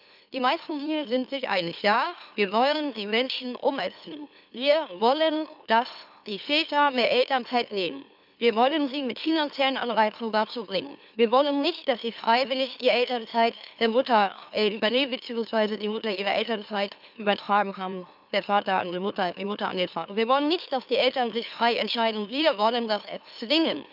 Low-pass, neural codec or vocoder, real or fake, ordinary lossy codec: 5.4 kHz; autoencoder, 44.1 kHz, a latent of 192 numbers a frame, MeloTTS; fake; none